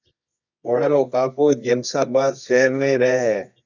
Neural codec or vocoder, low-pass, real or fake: codec, 24 kHz, 0.9 kbps, WavTokenizer, medium music audio release; 7.2 kHz; fake